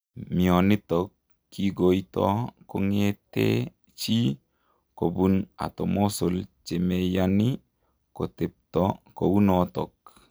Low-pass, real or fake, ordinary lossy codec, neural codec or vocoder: none; real; none; none